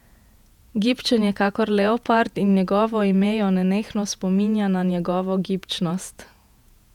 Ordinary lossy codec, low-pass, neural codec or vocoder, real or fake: none; 19.8 kHz; vocoder, 48 kHz, 128 mel bands, Vocos; fake